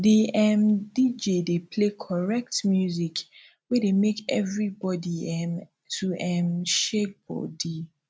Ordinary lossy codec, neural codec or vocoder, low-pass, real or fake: none; none; none; real